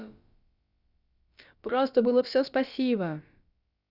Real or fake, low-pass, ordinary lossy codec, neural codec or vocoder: fake; 5.4 kHz; Opus, 64 kbps; codec, 16 kHz, about 1 kbps, DyCAST, with the encoder's durations